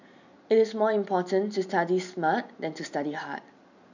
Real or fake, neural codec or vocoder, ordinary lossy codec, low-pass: real; none; none; 7.2 kHz